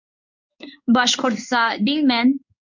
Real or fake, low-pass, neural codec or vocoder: fake; 7.2 kHz; codec, 16 kHz in and 24 kHz out, 1 kbps, XY-Tokenizer